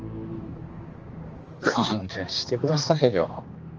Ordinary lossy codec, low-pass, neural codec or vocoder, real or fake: Opus, 24 kbps; 7.2 kHz; codec, 16 kHz, 2 kbps, X-Codec, HuBERT features, trained on balanced general audio; fake